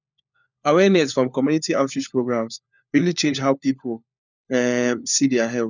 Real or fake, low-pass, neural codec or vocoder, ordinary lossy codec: fake; 7.2 kHz; codec, 16 kHz, 4 kbps, FunCodec, trained on LibriTTS, 50 frames a second; none